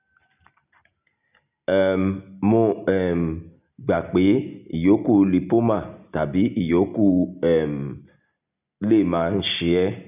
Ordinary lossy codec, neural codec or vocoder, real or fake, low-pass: none; none; real; 3.6 kHz